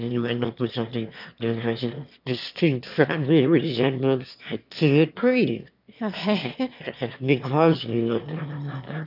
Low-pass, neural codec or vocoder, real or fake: 5.4 kHz; autoencoder, 22.05 kHz, a latent of 192 numbers a frame, VITS, trained on one speaker; fake